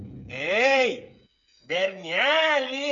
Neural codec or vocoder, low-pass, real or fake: codec, 16 kHz, 8 kbps, FreqCodec, smaller model; 7.2 kHz; fake